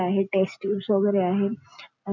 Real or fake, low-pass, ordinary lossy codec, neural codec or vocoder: real; 7.2 kHz; none; none